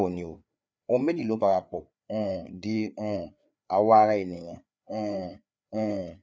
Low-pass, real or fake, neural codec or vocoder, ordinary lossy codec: none; fake; codec, 16 kHz, 4 kbps, FreqCodec, larger model; none